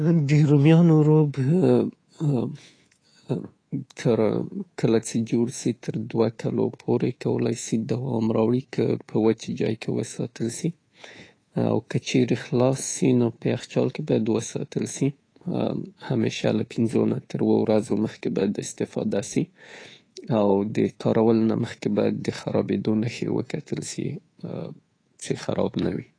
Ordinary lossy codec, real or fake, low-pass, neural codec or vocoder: AAC, 32 kbps; fake; 9.9 kHz; codec, 24 kHz, 3.1 kbps, DualCodec